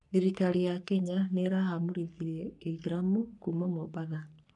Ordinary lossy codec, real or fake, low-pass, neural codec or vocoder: none; fake; 10.8 kHz; codec, 44.1 kHz, 3.4 kbps, Pupu-Codec